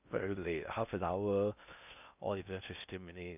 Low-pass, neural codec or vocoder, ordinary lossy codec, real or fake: 3.6 kHz; codec, 16 kHz in and 24 kHz out, 0.6 kbps, FocalCodec, streaming, 4096 codes; none; fake